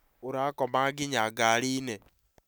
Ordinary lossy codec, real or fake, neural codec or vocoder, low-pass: none; real; none; none